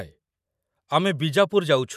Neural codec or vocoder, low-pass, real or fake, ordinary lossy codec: vocoder, 44.1 kHz, 128 mel bands every 512 samples, BigVGAN v2; 14.4 kHz; fake; none